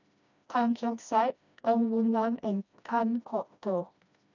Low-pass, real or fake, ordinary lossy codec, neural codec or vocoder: 7.2 kHz; fake; none; codec, 16 kHz, 1 kbps, FreqCodec, smaller model